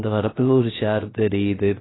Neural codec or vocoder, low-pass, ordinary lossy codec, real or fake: codec, 16 kHz, 0.3 kbps, FocalCodec; 7.2 kHz; AAC, 16 kbps; fake